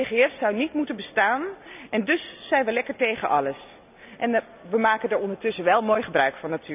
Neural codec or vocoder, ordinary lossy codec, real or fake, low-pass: none; none; real; 3.6 kHz